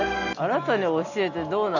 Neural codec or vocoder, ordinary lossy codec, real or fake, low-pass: none; none; real; 7.2 kHz